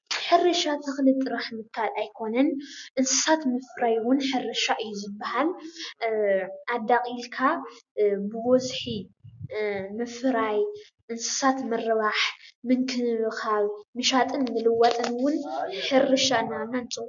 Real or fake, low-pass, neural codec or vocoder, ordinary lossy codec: real; 7.2 kHz; none; MP3, 96 kbps